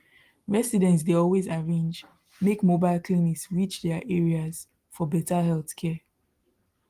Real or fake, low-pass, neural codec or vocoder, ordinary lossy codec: real; 14.4 kHz; none; Opus, 24 kbps